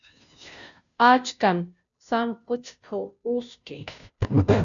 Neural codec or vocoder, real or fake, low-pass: codec, 16 kHz, 0.5 kbps, FunCodec, trained on Chinese and English, 25 frames a second; fake; 7.2 kHz